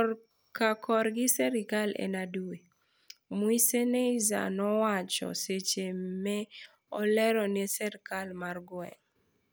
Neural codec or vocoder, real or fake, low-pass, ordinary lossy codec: none; real; none; none